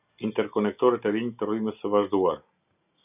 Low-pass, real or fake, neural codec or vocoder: 3.6 kHz; real; none